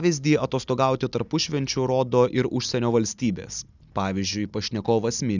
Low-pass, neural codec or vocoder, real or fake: 7.2 kHz; none; real